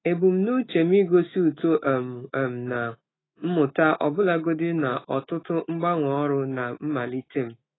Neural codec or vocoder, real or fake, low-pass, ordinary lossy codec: autoencoder, 48 kHz, 128 numbers a frame, DAC-VAE, trained on Japanese speech; fake; 7.2 kHz; AAC, 16 kbps